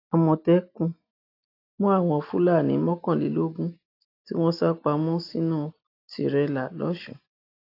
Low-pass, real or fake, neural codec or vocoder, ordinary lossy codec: 5.4 kHz; real; none; AAC, 32 kbps